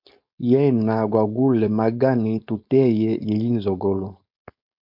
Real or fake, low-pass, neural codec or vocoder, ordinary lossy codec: fake; 5.4 kHz; codec, 16 kHz, 4.8 kbps, FACodec; MP3, 48 kbps